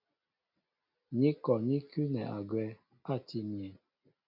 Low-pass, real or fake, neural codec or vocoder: 5.4 kHz; real; none